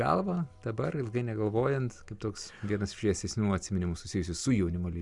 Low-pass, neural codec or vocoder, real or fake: 10.8 kHz; none; real